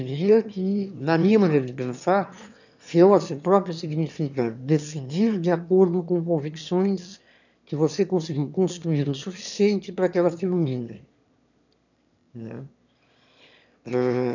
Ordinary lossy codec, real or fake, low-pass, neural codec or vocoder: none; fake; 7.2 kHz; autoencoder, 22.05 kHz, a latent of 192 numbers a frame, VITS, trained on one speaker